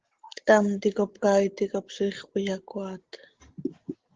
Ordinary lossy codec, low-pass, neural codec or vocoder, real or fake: Opus, 16 kbps; 7.2 kHz; none; real